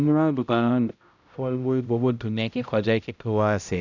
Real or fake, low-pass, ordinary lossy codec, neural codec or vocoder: fake; 7.2 kHz; none; codec, 16 kHz, 0.5 kbps, X-Codec, HuBERT features, trained on balanced general audio